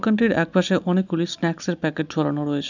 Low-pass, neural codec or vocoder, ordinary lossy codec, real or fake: 7.2 kHz; none; none; real